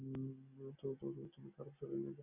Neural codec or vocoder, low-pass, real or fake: none; 3.6 kHz; real